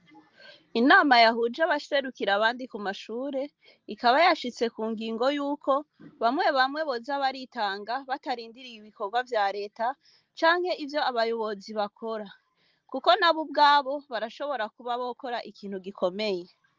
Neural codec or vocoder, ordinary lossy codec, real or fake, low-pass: none; Opus, 24 kbps; real; 7.2 kHz